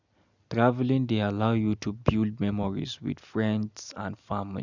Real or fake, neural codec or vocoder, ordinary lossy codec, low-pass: real; none; none; 7.2 kHz